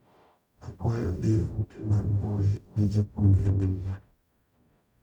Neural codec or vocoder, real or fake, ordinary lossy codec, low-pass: codec, 44.1 kHz, 0.9 kbps, DAC; fake; none; 19.8 kHz